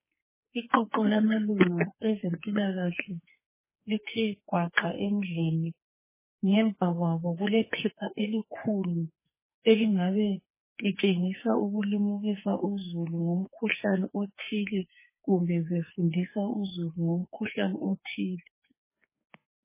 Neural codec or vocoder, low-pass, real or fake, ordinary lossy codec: codec, 44.1 kHz, 2.6 kbps, SNAC; 3.6 kHz; fake; MP3, 16 kbps